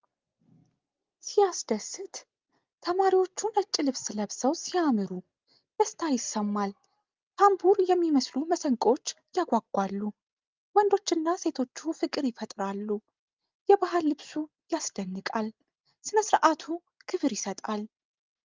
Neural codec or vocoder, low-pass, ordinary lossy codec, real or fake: none; 7.2 kHz; Opus, 32 kbps; real